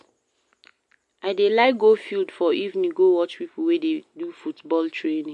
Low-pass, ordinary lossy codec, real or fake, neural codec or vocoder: 10.8 kHz; MP3, 64 kbps; real; none